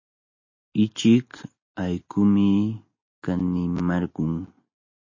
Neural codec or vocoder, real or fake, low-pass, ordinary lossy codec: none; real; 7.2 kHz; MP3, 32 kbps